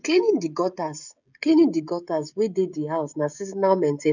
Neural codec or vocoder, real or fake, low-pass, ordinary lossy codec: codec, 16 kHz, 16 kbps, FreqCodec, smaller model; fake; 7.2 kHz; none